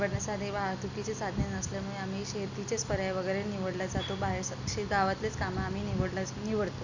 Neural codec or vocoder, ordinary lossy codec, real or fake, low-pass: none; none; real; 7.2 kHz